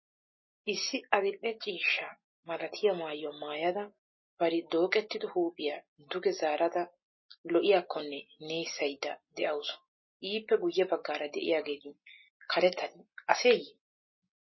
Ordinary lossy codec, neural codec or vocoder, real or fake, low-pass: MP3, 24 kbps; none; real; 7.2 kHz